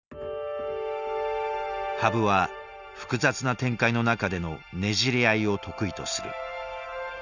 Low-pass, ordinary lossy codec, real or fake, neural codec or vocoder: 7.2 kHz; none; real; none